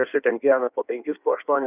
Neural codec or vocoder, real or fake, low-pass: codec, 16 kHz in and 24 kHz out, 1.1 kbps, FireRedTTS-2 codec; fake; 3.6 kHz